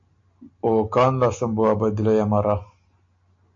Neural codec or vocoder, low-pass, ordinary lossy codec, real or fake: none; 7.2 kHz; MP3, 48 kbps; real